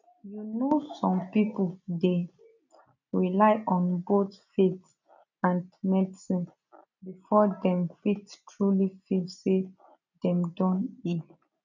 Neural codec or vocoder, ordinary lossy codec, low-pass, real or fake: none; none; 7.2 kHz; real